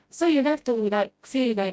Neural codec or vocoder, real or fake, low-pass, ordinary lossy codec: codec, 16 kHz, 0.5 kbps, FreqCodec, smaller model; fake; none; none